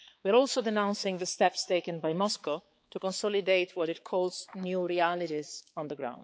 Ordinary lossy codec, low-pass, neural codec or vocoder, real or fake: none; none; codec, 16 kHz, 4 kbps, X-Codec, HuBERT features, trained on balanced general audio; fake